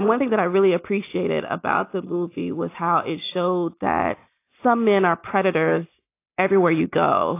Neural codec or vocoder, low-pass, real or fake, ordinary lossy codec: none; 3.6 kHz; real; AAC, 24 kbps